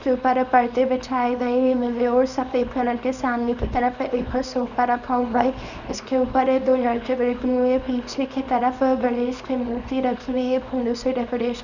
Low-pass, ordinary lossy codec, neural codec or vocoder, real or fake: 7.2 kHz; none; codec, 24 kHz, 0.9 kbps, WavTokenizer, small release; fake